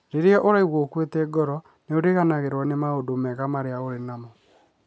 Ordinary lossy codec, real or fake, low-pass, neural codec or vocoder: none; real; none; none